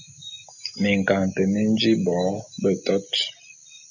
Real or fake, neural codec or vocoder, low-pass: real; none; 7.2 kHz